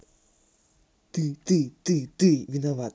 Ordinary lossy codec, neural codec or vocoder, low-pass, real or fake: none; none; none; real